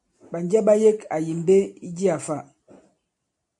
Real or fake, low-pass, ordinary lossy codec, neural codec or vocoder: real; 10.8 kHz; AAC, 64 kbps; none